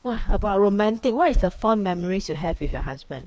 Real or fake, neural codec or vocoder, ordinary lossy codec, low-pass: fake; codec, 16 kHz, 2 kbps, FreqCodec, larger model; none; none